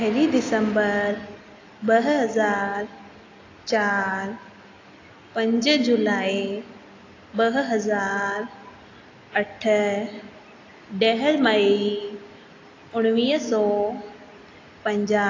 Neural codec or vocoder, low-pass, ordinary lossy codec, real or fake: none; 7.2 kHz; AAC, 32 kbps; real